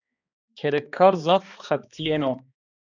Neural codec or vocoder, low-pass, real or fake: codec, 16 kHz, 2 kbps, X-Codec, HuBERT features, trained on balanced general audio; 7.2 kHz; fake